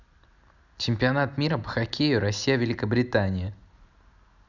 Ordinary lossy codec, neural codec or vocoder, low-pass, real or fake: none; none; 7.2 kHz; real